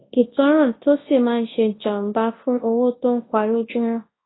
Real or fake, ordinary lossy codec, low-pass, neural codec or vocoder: fake; AAC, 16 kbps; 7.2 kHz; codec, 24 kHz, 0.9 kbps, WavTokenizer, large speech release